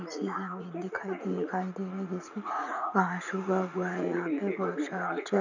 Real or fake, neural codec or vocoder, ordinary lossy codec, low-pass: fake; vocoder, 44.1 kHz, 80 mel bands, Vocos; none; 7.2 kHz